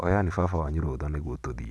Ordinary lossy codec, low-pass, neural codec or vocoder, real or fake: none; none; none; real